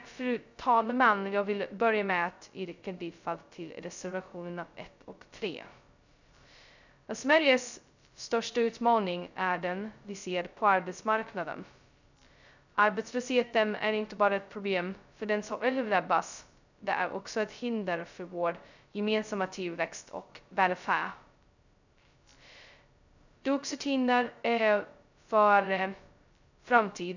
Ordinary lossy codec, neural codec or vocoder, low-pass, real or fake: none; codec, 16 kHz, 0.2 kbps, FocalCodec; 7.2 kHz; fake